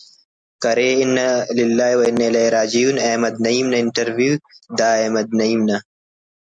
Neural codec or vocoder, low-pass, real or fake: none; 9.9 kHz; real